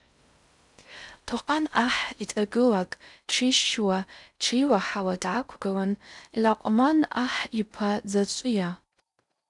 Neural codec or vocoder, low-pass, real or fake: codec, 16 kHz in and 24 kHz out, 0.6 kbps, FocalCodec, streaming, 4096 codes; 10.8 kHz; fake